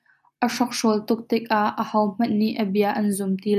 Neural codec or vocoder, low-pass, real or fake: none; 14.4 kHz; real